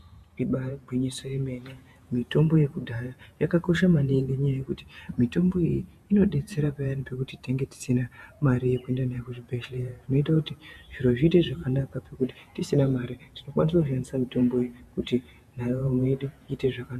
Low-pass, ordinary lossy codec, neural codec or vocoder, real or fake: 14.4 kHz; MP3, 96 kbps; vocoder, 48 kHz, 128 mel bands, Vocos; fake